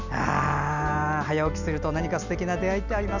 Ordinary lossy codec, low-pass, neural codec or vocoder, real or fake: none; 7.2 kHz; none; real